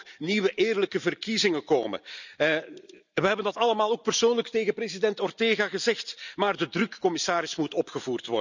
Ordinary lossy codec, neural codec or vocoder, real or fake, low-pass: none; none; real; 7.2 kHz